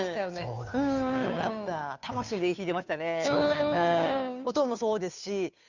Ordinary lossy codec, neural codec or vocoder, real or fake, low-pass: none; codec, 16 kHz, 2 kbps, FunCodec, trained on Chinese and English, 25 frames a second; fake; 7.2 kHz